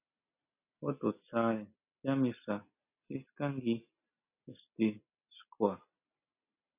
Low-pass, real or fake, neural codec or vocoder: 3.6 kHz; real; none